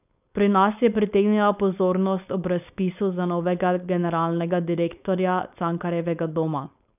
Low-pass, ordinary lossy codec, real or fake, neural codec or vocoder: 3.6 kHz; none; fake; codec, 16 kHz, 4.8 kbps, FACodec